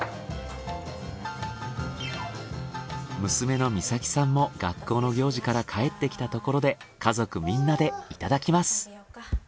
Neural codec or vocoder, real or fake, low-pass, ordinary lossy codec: none; real; none; none